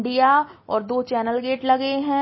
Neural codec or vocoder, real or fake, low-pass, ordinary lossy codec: none; real; 7.2 kHz; MP3, 24 kbps